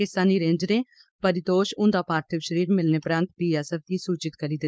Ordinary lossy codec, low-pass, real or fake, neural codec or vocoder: none; none; fake; codec, 16 kHz, 4.8 kbps, FACodec